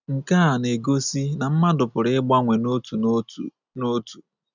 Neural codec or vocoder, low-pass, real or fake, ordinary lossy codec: none; 7.2 kHz; real; none